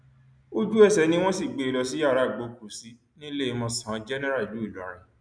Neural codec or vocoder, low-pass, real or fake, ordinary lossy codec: none; 9.9 kHz; real; none